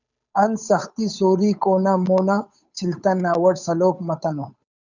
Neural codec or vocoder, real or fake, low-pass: codec, 16 kHz, 8 kbps, FunCodec, trained on Chinese and English, 25 frames a second; fake; 7.2 kHz